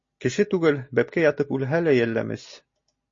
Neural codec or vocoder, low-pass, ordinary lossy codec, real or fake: none; 7.2 kHz; MP3, 32 kbps; real